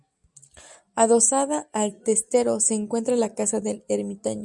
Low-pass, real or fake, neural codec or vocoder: 10.8 kHz; real; none